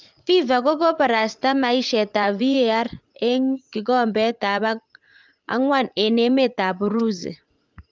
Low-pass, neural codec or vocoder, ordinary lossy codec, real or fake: 7.2 kHz; vocoder, 44.1 kHz, 80 mel bands, Vocos; Opus, 24 kbps; fake